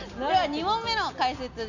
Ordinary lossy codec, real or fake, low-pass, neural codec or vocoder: none; real; 7.2 kHz; none